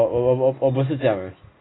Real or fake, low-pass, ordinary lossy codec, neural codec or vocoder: real; 7.2 kHz; AAC, 16 kbps; none